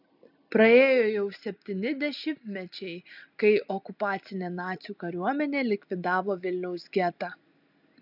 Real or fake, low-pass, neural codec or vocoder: real; 5.4 kHz; none